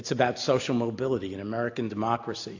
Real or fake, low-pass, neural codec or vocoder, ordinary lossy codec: real; 7.2 kHz; none; AAC, 48 kbps